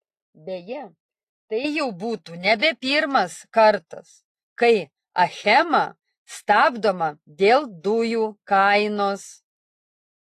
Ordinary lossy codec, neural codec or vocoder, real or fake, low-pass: AAC, 48 kbps; none; real; 14.4 kHz